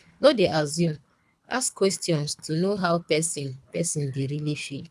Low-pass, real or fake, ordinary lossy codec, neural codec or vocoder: none; fake; none; codec, 24 kHz, 3 kbps, HILCodec